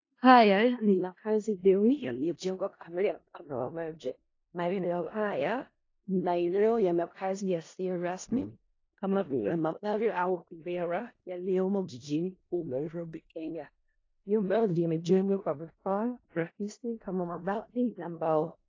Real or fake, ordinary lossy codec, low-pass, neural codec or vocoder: fake; AAC, 32 kbps; 7.2 kHz; codec, 16 kHz in and 24 kHz out, 0.4 kbps, LongCat-Audio-Codec, four codebook decoder